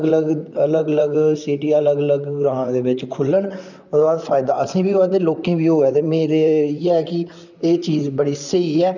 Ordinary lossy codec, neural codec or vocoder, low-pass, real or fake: none; vocoder, 44.1 kHz, 128 mel bands, Pupu-Vocoder; 7.2 kHz; fake